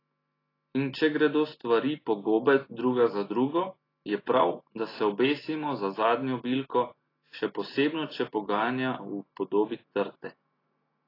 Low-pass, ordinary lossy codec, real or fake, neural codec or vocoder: 5.4 kHz; AAC, 24 kbps; real; none